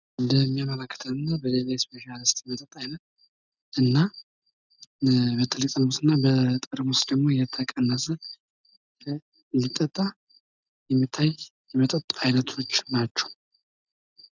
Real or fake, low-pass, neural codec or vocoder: real; 7.2 kHz; none